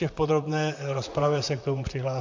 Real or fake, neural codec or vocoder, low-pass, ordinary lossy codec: fake; vocoder, 44.1 kHz, 128 mel bands, Pupu-Vocoder; 7.2 kHz; MP3, 64 kbps